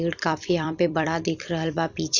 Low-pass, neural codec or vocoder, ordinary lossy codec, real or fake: 7.2 kHz; vocoder, 44.1 kHz, 128 mel bands every 256 samples, BigVGAN v2; none; fake